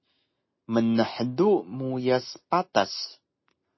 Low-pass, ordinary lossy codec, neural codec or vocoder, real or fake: 7.2 kHz; MP3, 24 kbps; none; real